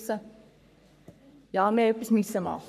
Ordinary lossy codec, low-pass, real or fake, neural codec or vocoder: AAC, 96 kbps; 14.4 kHz; fake; codec, 44.1 kHz, 3.4 kbps, Pupu-Codec